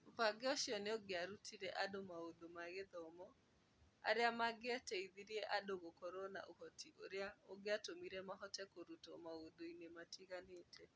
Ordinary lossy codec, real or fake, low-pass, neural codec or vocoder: none; real; none; none